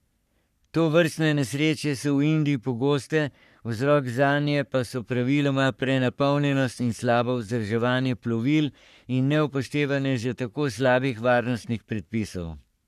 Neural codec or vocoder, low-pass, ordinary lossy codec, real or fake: codec, 44.1 kHz, 3.4 kbps, Pupu-Codec; 14.4 kHz; none; fake